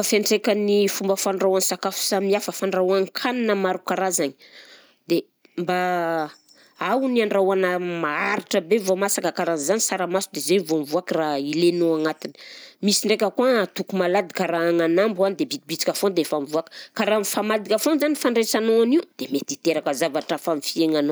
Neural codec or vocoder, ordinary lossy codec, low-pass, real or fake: none; none; none; real